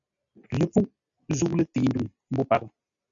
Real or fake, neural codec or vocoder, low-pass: real; none; 7.2 kHz